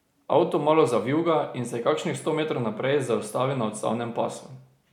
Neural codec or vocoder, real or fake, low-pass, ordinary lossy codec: none; real; 19.8 kHz; none